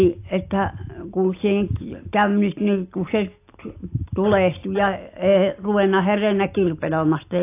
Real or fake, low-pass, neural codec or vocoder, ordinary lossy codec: real; 3.6 kHz; none; AAC, 24 kbps